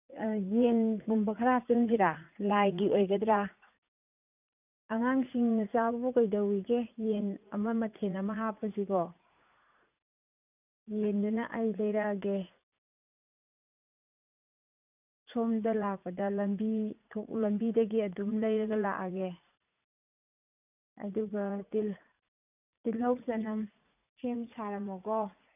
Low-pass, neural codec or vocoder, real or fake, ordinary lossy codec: 3.6 kHz; vocoder, 44.1 kHz, 80 mel bands, Vocos; fake; none